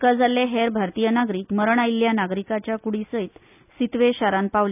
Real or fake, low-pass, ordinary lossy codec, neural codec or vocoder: real; 3.6 kHz; none; none